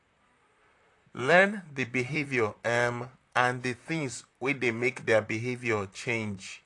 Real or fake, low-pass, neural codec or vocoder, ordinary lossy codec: fake; 10.8 kHz; vocoder, 44.1 kHz, 128 mel bands, Pupu-Vocoder; AAC, 48 kbps